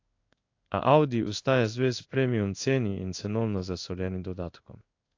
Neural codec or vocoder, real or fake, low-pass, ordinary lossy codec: codec, 16 kHz in and 24 kHz out, 1 kbps, XY-Tokenizer; fake; 7.2 kHz; AAC, 48 kbps